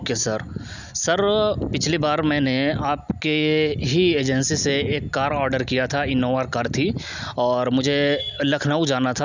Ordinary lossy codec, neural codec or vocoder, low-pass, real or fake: none; none; 7.2 kHz; real